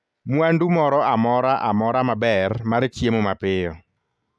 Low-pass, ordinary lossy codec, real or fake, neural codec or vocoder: none; none; real; none